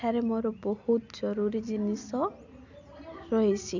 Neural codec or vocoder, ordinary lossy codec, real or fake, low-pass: none; none; real; 7.2 kHz